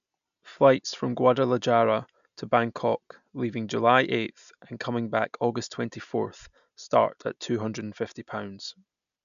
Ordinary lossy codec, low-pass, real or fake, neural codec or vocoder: none; 7.2 kHz; real; none